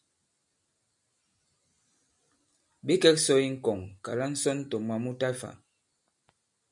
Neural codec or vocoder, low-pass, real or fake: none; 10.8 kHz; real